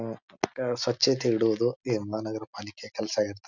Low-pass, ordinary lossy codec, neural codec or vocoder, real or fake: 7.2 kHz; none; none; real